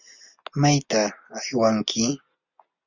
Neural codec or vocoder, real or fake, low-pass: none; real; 7.2 kHz